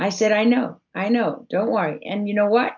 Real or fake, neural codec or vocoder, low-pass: real; none; 7.2 kHz